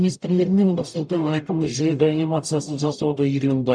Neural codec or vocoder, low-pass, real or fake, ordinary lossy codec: codec, 44.1 kHz, 0.9 kbps, DAC; 9.9 kHz; fake; none